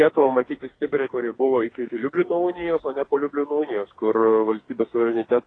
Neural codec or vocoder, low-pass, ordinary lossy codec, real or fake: codec, 44.1 kHz, 2.6 kbps, SNAC; 9.9 kHz; AAC, 32 kbps; fake